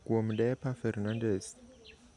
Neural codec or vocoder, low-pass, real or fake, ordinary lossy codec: none; 10.8 kHz; real; MP3, 96 kbps